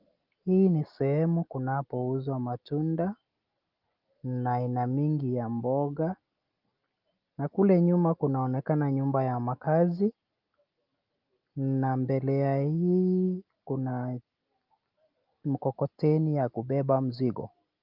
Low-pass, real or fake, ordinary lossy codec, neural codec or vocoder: 5.4 kHz; real; Opus, 24 kbps; none